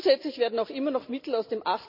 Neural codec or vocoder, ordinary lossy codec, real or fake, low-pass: none; none; real; 5.4 kHz